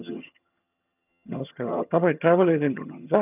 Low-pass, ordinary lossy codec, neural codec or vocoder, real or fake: 3.6 kHz; none; vocoder, 22.05 kHz, 80 mel bands, HiFi-GAN; fake